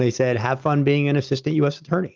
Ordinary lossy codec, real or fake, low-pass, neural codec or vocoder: Opus, 24 kbps; real; 7.2 kHz; none